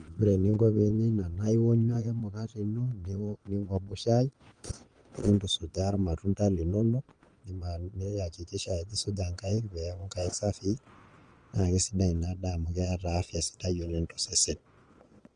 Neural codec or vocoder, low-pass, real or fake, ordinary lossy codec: vocoder, 22.05 kHz, 80 mel bands, Vocos; 9.9 kHz; fake; Opus, 24 kbps